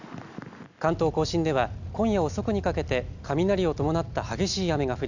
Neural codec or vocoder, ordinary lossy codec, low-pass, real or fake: none; none; 7.2 kHz; real